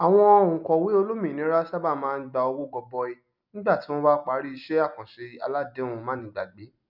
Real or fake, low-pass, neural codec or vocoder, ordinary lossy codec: real; 5.4 kHz; none; none